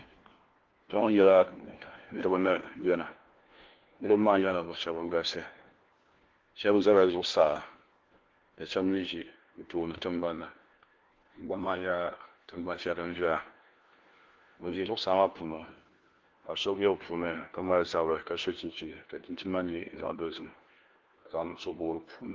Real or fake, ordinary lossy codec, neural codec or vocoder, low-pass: fake; Opus, 16 kbps; codec, 16 kHz, 1 kbps, FunCodec, trained on LibriTTS, 50 frames a second; 7.2 kHz